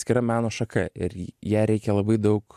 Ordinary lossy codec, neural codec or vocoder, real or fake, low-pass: AAC, 96 kbps; none; real; 14.4 kHz